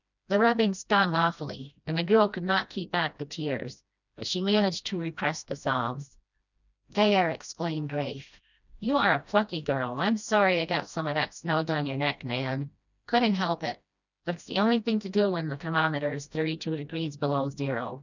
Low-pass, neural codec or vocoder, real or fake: 7.2 kHz; codec, 16 kHz, 1 kbps, FreqCodec, smaller model; fake